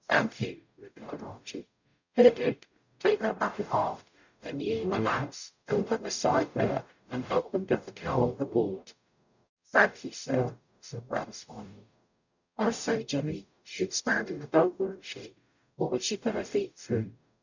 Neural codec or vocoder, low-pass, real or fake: codec, 44.1 kHz, 0.9 kbps, DAC; 7.2 kHz; fake